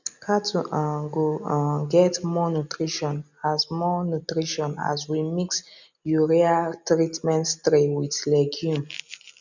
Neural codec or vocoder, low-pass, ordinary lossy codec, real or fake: none; 7.2 kHz; none; real